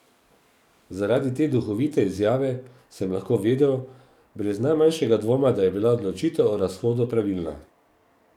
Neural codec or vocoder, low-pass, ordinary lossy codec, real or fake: codec, 44.1 kHz, 7.8 kbps, DAC; 19.8 kHz; none; fake